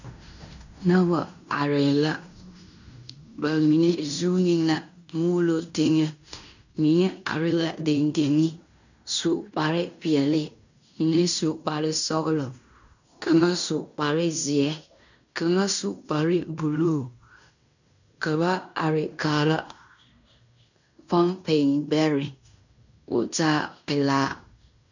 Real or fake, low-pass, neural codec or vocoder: fake; 7.2 kHz; codec, 16 kHz in and 24 kHz out, 0.9 kbps, LongCat-Audio-Codec, fine tuned four codebook decoder